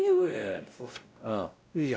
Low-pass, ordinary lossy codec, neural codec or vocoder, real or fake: none; none; codec, 16 kHz, 0.5 kbps, X-Codec, WavLM features, trained on Multilingual LibriSpeech; fake